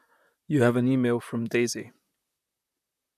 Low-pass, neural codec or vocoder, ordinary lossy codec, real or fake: 14.4 kHz; vocoder, 44.1 kHz, 128 mel bands, Pupu-Vocoder; none; fake